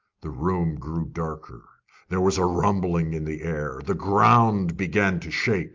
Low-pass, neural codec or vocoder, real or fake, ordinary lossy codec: 7.2 kHz; none; real; Opus, 24 kbps